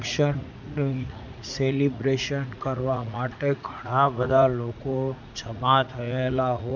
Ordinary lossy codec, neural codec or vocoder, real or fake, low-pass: none; vocoder, 44.1 kHz, 80 mel bands, Vocos; fake; 7.2 kHz